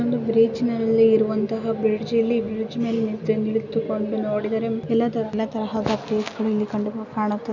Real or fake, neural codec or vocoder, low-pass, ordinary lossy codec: real; none; 7.2 kHz; none